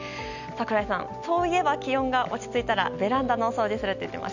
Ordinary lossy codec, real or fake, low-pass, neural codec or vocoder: none; real; 7.2 kHz; none